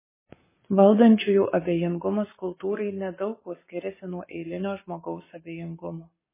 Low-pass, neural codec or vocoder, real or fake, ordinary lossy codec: 3.6 kHz; codec, 44.1 kHz, 7.8 kbps, Pupu-Codec; fake; MP3, 16 kbps